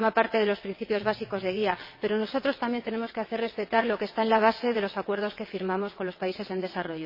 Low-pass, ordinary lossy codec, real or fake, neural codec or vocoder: 5.4 kHz; MP3, 24 kbps; fake; vocoder, 22.05 kHz, 80 mel bands, WaveNeXt